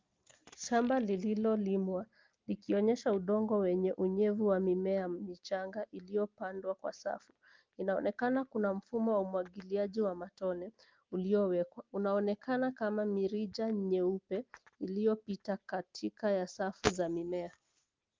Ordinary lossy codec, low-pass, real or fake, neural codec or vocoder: Opus, 24 kbps; 7.2 kHz; real; none